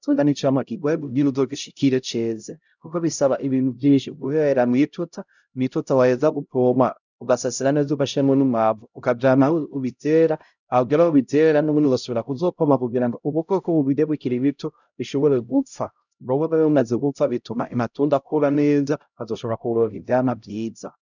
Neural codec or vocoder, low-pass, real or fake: codec, 16 kHz, 0.5 kbps, X-Codec, HuBERT features, trained on LibriSpeech; 7.2 kHz; fake